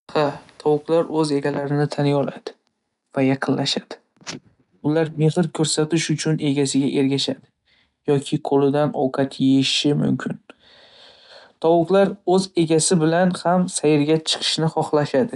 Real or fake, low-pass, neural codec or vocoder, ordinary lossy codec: real; 10.8 kHz; none; none